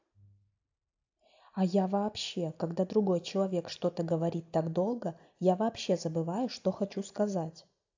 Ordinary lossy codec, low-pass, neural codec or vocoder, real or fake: AAC, 48 kbps; 7.2 kHz; none; real